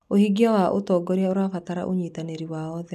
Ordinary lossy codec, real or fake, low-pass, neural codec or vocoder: none; real; 14.4 kHz; none